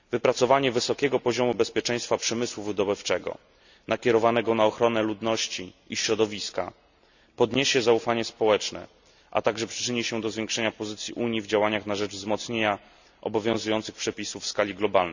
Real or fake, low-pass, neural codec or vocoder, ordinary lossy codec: real; 7.2 kHz; none; none